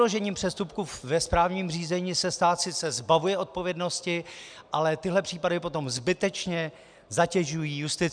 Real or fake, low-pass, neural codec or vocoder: real; 9.9 kHz; none